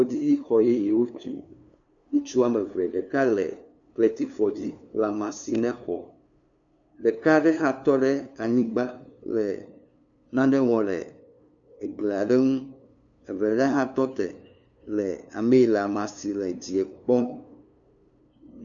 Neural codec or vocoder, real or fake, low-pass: codec, 16 kHz, 2 kbps, FunCodec, trained on LibriTTS, 25 frames a second; fake; 7.2 kHz